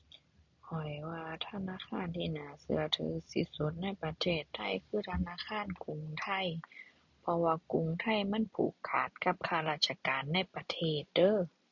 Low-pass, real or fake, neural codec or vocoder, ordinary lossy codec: 7.2 kHz; real; none; none